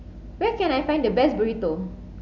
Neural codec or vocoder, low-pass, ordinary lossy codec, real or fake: none; 7.2 kHz; none; real